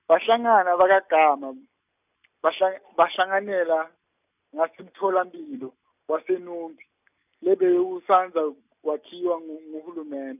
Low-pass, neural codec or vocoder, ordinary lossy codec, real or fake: 3.6 kHz; none; none; real